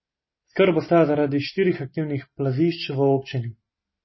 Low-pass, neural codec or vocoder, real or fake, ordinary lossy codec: 7.2 kHz; none; real; MP3, 24 kbps